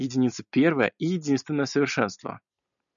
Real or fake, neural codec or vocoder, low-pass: real; none; 7.2 kHz